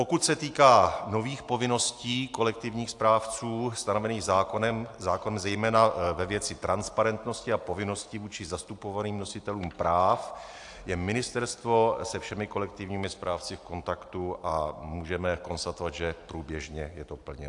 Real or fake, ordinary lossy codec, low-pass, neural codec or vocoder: real; AAC, 64 kbps; 10.8 kHz; none